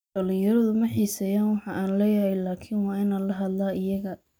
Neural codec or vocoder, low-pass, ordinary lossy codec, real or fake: none; none; none; real